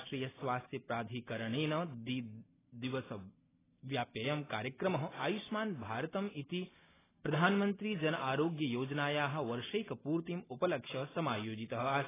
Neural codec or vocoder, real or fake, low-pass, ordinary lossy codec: none; real; 3.6 kHz; AAC, 16 kbps